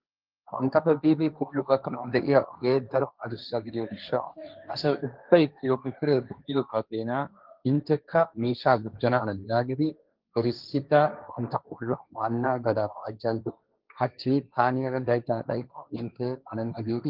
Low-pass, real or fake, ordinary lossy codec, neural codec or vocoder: 5.4 kHz; fake; Opus, 32 kbps; codec, 16 kHz, 1.1 kbps, Voila-Tokenizer